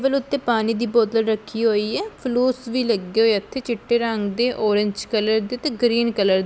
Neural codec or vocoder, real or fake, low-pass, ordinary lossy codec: none; real; none; none